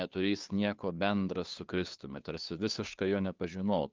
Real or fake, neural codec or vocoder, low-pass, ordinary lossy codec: fake; codec, 16 kHz, 2 kbps, FunCodec, trained on Chinese and English, 25 frames a second; 7.2 kHz; Opus, 32 kbps